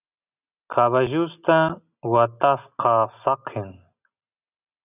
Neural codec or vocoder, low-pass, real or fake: none; 3.6 kHz; real